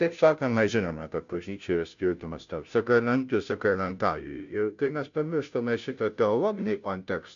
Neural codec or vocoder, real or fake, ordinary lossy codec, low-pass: codec, 16 kHz, 0.5 kbps, FunCodec, trained on Chinese and English, 25 frames a second; fake; MP3, 64 kbps; 7.2 kHz